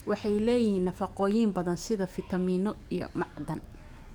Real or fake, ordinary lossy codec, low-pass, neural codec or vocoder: fake; none; 19.8 kHz; codec, 44.1 kHz, 7.8 kbps, DAC